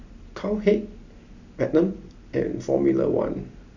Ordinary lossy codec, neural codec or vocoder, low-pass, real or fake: none; none; 7.2 kHz; real